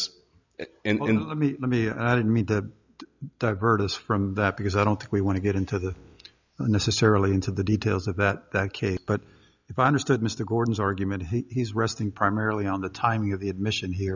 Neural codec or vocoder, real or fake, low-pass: none; real; 7.2 kHz